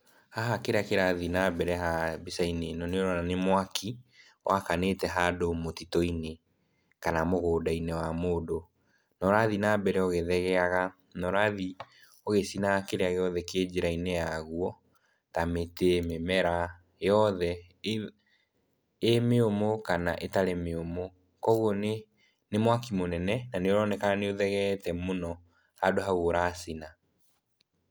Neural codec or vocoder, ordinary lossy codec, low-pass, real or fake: none; none; none; real